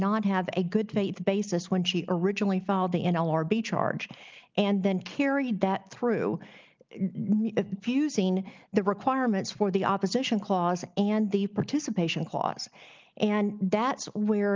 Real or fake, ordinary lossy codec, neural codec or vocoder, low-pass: real; Opus, 24 kbps; none; 7.2 kHz